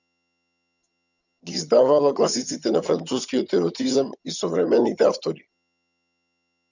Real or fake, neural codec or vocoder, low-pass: fake; vocoder, 22.05 kHz, 80 mel bands, HiFi-GAN; 7.2 kHz